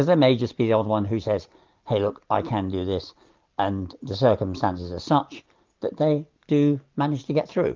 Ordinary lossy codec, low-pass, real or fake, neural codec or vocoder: Opus, 32 kbps; 7.2 kHz; real; none